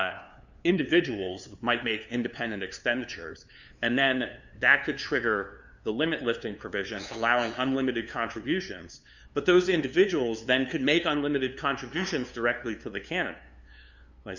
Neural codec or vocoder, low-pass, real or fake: codec, 16 kHz, 2 kbps, FunCodec, trained on LibriTTS, 25 frames a second; 7.2 kHz; fake